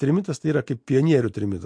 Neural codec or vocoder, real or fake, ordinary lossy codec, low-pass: none; real; MP3, 48 kbps; 9.9 kHz